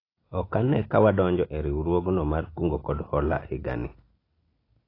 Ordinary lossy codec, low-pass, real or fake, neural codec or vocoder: AAC, 24 kbps; 5.4 kHz; real; none